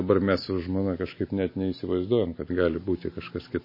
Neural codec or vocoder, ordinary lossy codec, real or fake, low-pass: none; MP3, 24 kbps; real; 5.4 kHz